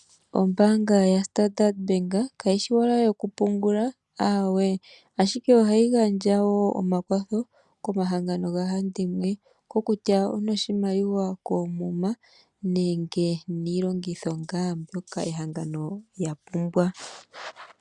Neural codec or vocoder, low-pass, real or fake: none; 10.8 kHz; real